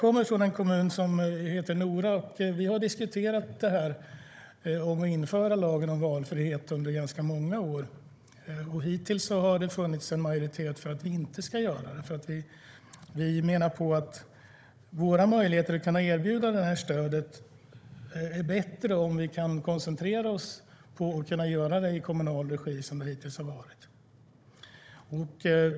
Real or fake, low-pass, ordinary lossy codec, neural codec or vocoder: fake; none; none; codec, 16 kHz, 16 kbps, FunCodec, trained on Chinese and English, 50 frames a second